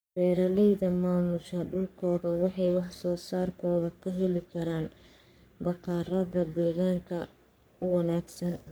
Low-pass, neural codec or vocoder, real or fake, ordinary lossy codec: none; codec, 44.1 kHz, 3.4 kbps, Pupu-Codec; fake; none